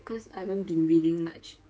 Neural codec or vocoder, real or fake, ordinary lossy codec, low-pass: codec, 16 kHz, 4 kbps, X-Codec, HuBERT features, trained on general audio; fake; none; none